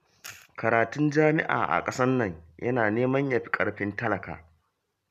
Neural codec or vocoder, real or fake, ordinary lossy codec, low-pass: none; real; none; 14.4 kHz